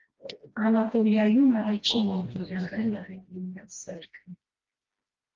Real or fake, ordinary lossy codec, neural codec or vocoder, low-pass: fake; Opus, 32 kbps; codec, 16 kHz, 1 kbps, FreqCodec, smaller model; 7.2 kHz